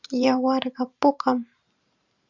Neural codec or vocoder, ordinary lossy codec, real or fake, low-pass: vocoder, 44.1 kHz, 128 mel bands every 512 samples, BigVGAN v2; Opus, 64 kbps; fake; 7.2 kHz